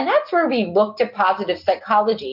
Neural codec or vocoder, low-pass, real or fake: none; 5.4 kHz; real